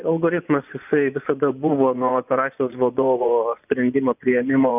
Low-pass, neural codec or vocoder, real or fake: 3.6 kHz; vocoder, 24 kHz, 100 mel bands, Vocos; fake